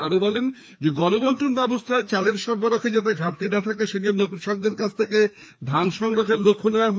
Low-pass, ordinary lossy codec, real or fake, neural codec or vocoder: none; none; fake; codec, 16 kHz, 2 kbps, FreqCodec, larger model